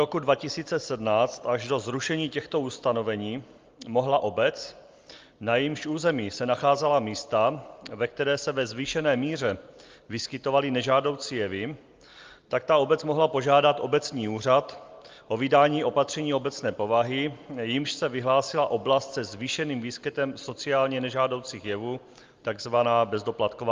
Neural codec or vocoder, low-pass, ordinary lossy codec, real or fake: none; 7.2 kHz; Opus, 32 kbps; real